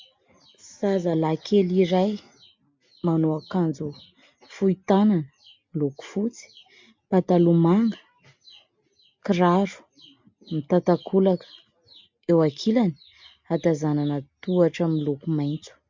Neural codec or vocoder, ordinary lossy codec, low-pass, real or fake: none; MP3, 64 kbps; 7.2 kHz; real